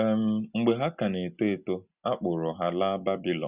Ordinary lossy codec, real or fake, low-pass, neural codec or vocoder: Opus, 64 kbps; real; 3.6 kHz; none